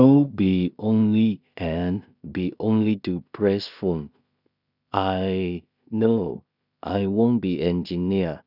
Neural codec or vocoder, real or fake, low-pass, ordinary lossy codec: codec, 16 kHz in and 24 kHz out, 0.4 kbps, LongCat-Audio-Codec, two codebook decoder; fake; 5.4 kHz; none